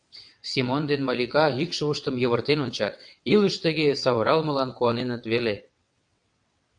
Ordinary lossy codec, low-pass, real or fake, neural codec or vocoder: AAC, 64 kbps; 9.9 kHz; fake; vocoder, 22.05 kHz, 80 mel bands, WaveNeXt